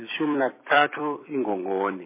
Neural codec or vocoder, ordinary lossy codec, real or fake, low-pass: vocoder, 44.1 kHz, 128 mel bands every 256 samples, BigVGAN v2; MP3, 16 kbps; fake; 3.6 kHz